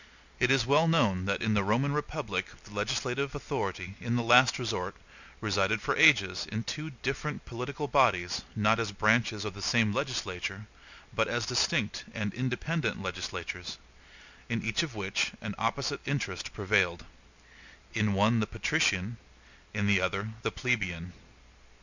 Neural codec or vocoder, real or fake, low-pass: none; real; 7.2 kHz